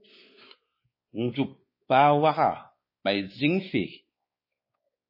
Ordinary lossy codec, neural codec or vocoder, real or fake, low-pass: MP3, 24 kbps; codec, 16 kHz, 4 kbps, X-Codec, WavLM features, trained on Multilingual LibriSpeech; fake; 5.4 kHz